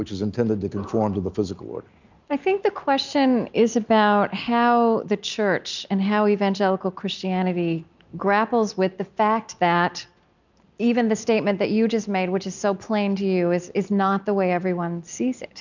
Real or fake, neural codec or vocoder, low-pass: real; none; 7.2 kHz